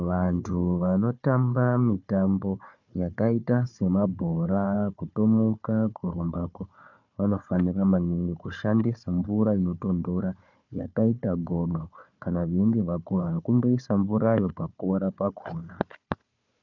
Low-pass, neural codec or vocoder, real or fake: 7.2 kHz; codec, 16 kHz, 4 kbps, FunCodec, trained on Chinese and English, 50 frames a second; fake